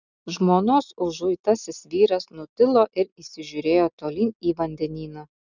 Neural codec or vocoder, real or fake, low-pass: none; real; 7.2 kHz